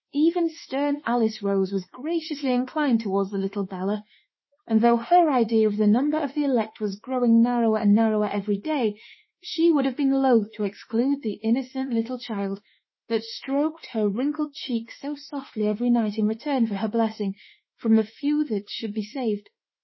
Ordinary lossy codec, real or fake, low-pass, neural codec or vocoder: MP3, 24 kbps; fake; 7.2 kHz; autoencoder, 48 kHz, 32 numbers a frame, DAC-VAE, trained on Japanese speech